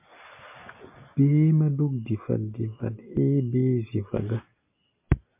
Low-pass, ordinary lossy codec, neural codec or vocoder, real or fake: 3.6 kHz; MP3, 32 kbps; none; real